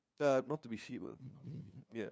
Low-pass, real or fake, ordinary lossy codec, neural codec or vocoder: none; fake; none; codec, 16 kHz, 2 kbps, FunCodec, trained on LibriTTS, 25 frames a second